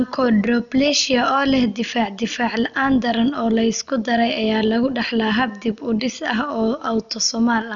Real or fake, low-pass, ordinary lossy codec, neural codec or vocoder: real; 7.2 kHz; none; none